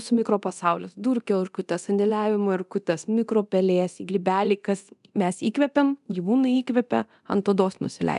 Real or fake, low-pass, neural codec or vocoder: fake; 10.8 kHz; codec, 24 kHz, 0.9 kbps, DualCodec